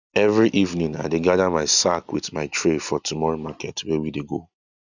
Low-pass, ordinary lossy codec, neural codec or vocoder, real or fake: 7.2 kHz; none; none; real